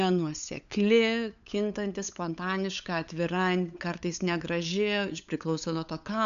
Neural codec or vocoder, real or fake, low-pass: codec, 16 kHz, 8 kbps, FunCodec, trained on LibriTTS, 25 frames a second; fake; 7.2 kHz